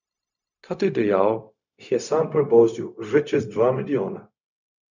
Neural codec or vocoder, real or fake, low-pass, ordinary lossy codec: codec, 16 kHz, 0.4 kbps, LongCat-Audio-Codec; fake; 7.2 kHz; none